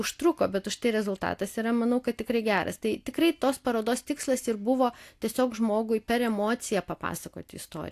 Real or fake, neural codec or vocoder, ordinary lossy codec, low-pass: real; none; AAC, 64 kbps; 14.4 kHz